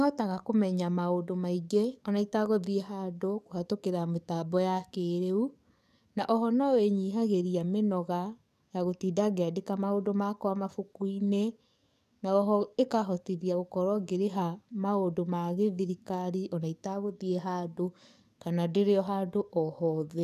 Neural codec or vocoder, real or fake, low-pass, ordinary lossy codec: codec, 44.1 kHz, 7.8 kbps, DAC; fake; 14.4 kHz; none